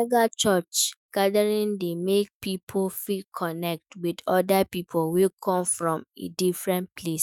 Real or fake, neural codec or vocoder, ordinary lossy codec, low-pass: fake; autoencoder, 48 kHz, 128 numbers a frame, DAC-VAE, trained on Japanese speech; none; none